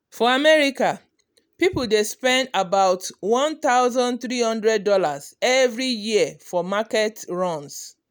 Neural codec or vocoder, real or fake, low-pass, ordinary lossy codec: none; real; none; none